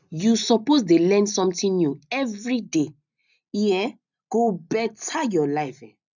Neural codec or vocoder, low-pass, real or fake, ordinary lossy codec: none; 7.2 kHz; real; none